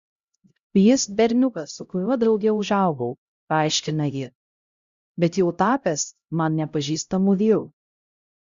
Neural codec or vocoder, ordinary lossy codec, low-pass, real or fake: codec, 16 kHz, 0.5 kbps, X-Codec, HuBERT features, trained on LibriSpeech; Opus, 64 kbps; 7.2 kHz; fake